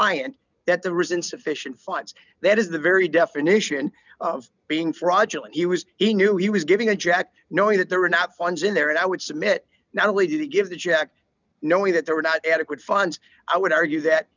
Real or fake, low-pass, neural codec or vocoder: real; 7.2 kHz; none